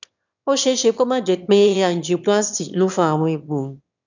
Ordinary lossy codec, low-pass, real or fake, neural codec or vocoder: none; 7.2 kHz; fake; autoencoder, 22.05 kHz, a latent of 192 numbers a frame, VITS, trained on one speaker